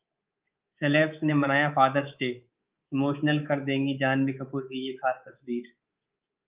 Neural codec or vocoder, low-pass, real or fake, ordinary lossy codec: codec, 24 kHz, 3.1 kbps, DualCodec; 3.6 kHz; fake; Opus, 24 kbps